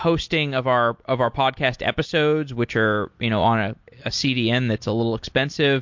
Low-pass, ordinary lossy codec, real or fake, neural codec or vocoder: 7.2 kHz; MP3, 48 kbps; real; none